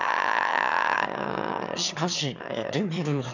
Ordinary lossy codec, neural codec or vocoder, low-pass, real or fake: none; autoencoder, 22.05 kHz, a latent of 192 numbers a frame, VITS, trained on one speaker; 7.2 kHz; fake